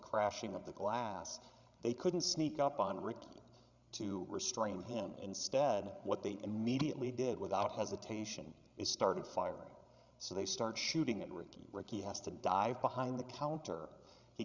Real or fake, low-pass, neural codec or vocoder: fake; 7.2 kHz; codec, 16 kHz, 16 kbps, FreqCodec, larger model